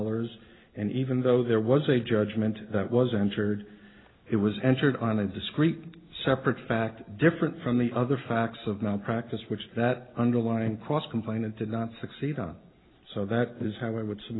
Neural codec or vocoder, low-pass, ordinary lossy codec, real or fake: none; 7.2 kHz; AAC, 16 kbps; real